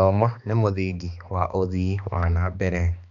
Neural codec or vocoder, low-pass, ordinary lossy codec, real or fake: codec, 16 kHz, 2 kbps, X-Codec, HuBERT features, trained on balanced general audio; 7.2 kHz; MP3, 96 kbps; fake